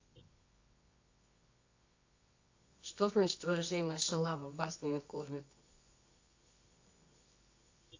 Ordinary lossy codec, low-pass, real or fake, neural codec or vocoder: AAC, 48 kbps; 7.2 kHz; fake; codec, 24 kHz, 0.9 kbps, WavTokenizer, medium music audio release